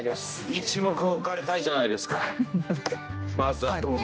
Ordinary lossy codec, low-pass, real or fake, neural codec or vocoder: none; none; fake; codec, 16 kHz, 1 kbps, X-Codec, HuBERT features, trained on general audio